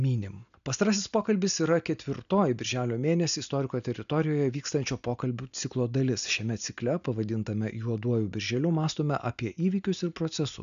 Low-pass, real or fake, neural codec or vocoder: 7.2 kHz; real; none